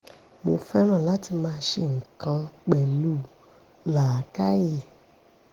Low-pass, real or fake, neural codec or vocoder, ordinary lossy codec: 19.8 kHz; real; none; Opus, 16 kbps